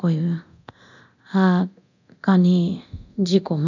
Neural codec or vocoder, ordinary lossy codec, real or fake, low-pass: codec, 24 kHz, 0.9 kbps, DualCodec; none; fake; 7.2 kHz